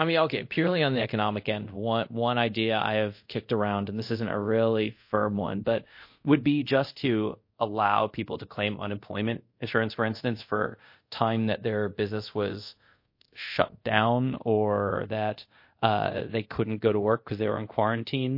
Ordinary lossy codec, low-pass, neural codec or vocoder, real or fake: MP3, 32 kbps; 5.4 kHz; codec, 24 kHz, 0.5 kbps, DualCodec; fake